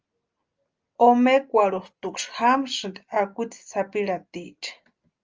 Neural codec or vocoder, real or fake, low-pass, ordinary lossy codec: none; real; 7.2 kHz; Opus, 24 kbps